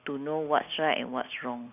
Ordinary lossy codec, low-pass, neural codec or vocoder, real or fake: none; 3.6 kHz; none; real